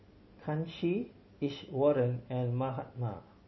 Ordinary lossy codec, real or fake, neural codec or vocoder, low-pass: MP3, 24 kbps; real; none; 7.2 kHz